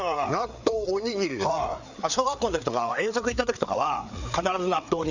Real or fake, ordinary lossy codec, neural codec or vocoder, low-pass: fake; none; codec, 16 kHz, 4 kbps, FreqCodec, larger model; 7.2 kHz